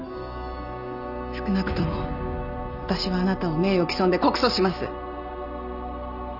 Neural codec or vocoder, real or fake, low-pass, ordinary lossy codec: none; real; 5.4 kHz; none